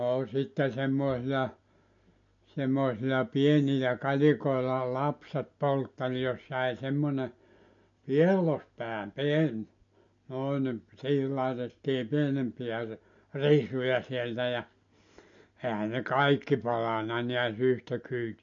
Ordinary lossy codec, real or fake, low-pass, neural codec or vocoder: MP3, 48 kbps; real; 7.2 kHz; none